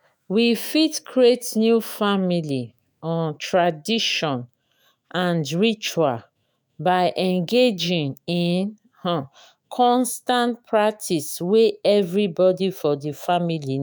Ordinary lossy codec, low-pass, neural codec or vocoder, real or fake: none; none; autoencoder, 48 kHz, 128 numbers a frame, DAC-VAE, trained on Japanese speech; fake